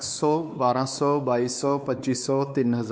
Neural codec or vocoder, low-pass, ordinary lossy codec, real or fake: codec, 16 kHz, 4 kbps, X-Codec, WavLM features, trained on Multilingual LibriSpeech; none; none; fake